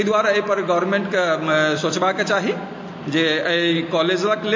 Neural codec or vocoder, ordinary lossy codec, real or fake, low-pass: none; MP3, 32 kbps; real; 7.2 kHz